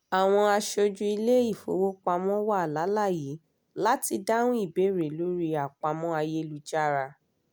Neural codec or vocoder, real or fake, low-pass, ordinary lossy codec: none; real; none; none